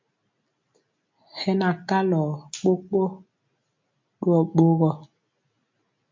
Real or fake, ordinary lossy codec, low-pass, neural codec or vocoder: real; MP3, 48 kbps; 7.2 kHz; none